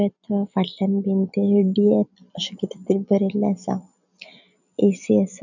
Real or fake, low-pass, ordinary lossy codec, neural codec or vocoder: real; 7.2 kHz; none; none